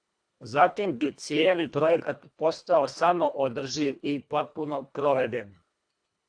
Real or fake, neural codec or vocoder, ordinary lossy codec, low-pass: fake; codec, 24 kHz, 1.5 kbps, HILCodec; AAC, 64 kbps; 9.9 kHz